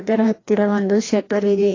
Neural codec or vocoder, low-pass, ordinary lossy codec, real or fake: codec, 16 kHz, 1 kbps, FreqCodec, larger model; 7.2 kHz; AAC, 32 kbps; fake